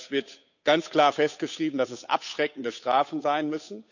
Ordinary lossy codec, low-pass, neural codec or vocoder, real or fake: none; 7.2 kHz; codec, 16 kHz, 8 kbps, FunCodec, trained on Chinese and English, 25 frames a second; fake